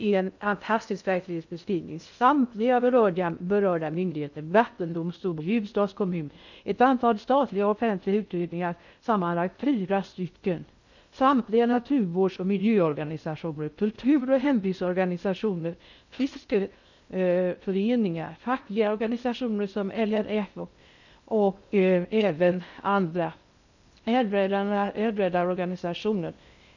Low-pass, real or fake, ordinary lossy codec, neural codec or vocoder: 7.2 kHz; fake; none; codec, 16 kHz in and 24 kHz out, 0.6 kbps, FocalCodec, streaming, 2048 codes